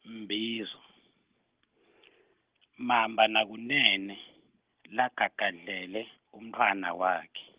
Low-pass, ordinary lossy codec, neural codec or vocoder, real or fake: 3.6 kHz; Opus, 16 kbps; none; real